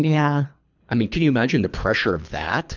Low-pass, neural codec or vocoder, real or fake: 7.2 kHz; codec, 24 kHz, 3 kbps, HILCodec; fake